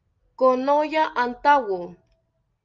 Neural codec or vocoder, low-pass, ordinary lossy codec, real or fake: none; 7.2 kHz; Opus, 24 kbps; real